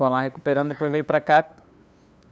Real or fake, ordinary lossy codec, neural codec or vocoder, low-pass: fake; none; codec, 16 kHz, 2 kbps, FunCodec, trained on LibriTTS, 25 frames a second; none